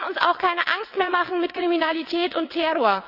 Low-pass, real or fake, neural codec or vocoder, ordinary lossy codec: 5.4 kHz; fake; vocoder, 22.05 kHz, 80 mel bands, WaveNeXt; none